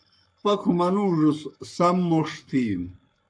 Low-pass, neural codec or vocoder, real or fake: 9.9 kHz; codec, 44.1 kHz, 7.8 kbps, Pupu-Codec; fake